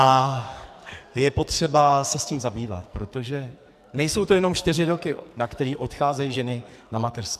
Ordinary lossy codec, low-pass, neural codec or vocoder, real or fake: MP3, 96 kbps; 14.4 kHz; codec, 44.1 kHz, 2.6 kbps, SNAC; fake